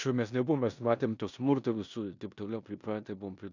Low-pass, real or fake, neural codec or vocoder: 7.2 kHz; fake; codec, 16 kHz in and 24 kHz out, 0.9 kbps, LongCat-Audio-Codec, four codebook decoder